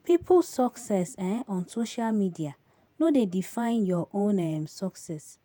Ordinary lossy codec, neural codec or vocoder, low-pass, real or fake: none; vocoder, 48 kHz, 128 mel bands, Vocos; none; fake